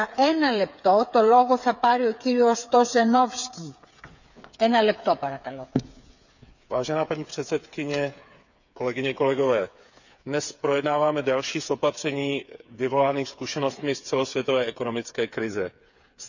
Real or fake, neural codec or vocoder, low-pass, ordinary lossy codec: fake; codec, 16 kHz, 8 kbps, FreqCodec, smaller model; 7.2 kHz; none